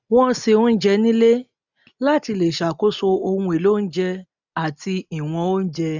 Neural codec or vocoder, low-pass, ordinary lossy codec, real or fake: none; 7.2 kHz; none; real